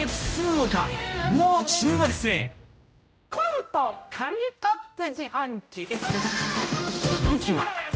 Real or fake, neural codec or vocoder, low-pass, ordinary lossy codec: fake; codec, 16 kHz, 0.5 kbps, X-Codec, HuBERT features, trained on general audio; none; none